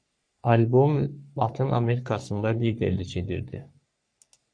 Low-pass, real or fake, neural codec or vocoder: 9.9 kHz; fake; codec, 44.1 kHz, 3.4 kbps, Pupu-Codec